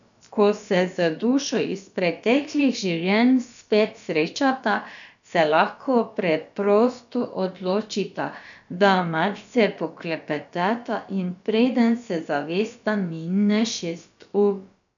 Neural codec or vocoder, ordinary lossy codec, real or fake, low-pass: codec, 16 kHz, about 1 kbps, DyCAST, with the encoder's durations; none; fake; 7.2 kHz